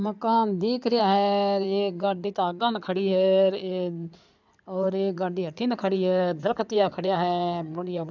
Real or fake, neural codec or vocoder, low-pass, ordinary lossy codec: fake; codec, 16 kHz in and 24 kHz out, 2.2 kbps, FireRedTTS-2 codec; 7.2 kHz; none